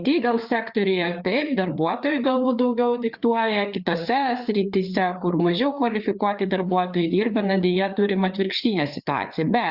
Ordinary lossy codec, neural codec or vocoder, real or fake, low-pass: Opus, 64 kbps; codec, 16 kHz, 4 kbps, FreqCodec, larger model; fake; 5.4 kHz